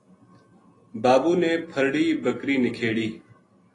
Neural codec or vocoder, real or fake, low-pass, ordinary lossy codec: none; real; 10.8 kHz; AAC, 32 kbps